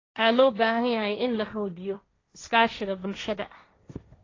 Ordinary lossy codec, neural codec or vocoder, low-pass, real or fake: AAC, 32 kbps; codec, 16 kHz, 1.1 kbps, Voila-Tokenizer; 7.2 kHz; fake